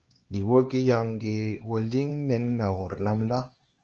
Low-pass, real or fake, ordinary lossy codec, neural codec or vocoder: 7.2 kHz; fake; Opus, 16 kbps; codec, 16 kHz, 2 kbps, X-Codec, HuBERT features, trained on LibriSpeech